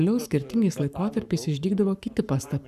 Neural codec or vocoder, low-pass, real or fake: codec, 44.1 kHz, 7.8 kbps, Pupu-Codec; 14.4 kHz; fake